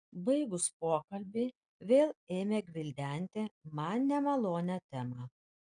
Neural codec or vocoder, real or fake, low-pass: none; real; 9.9 kHz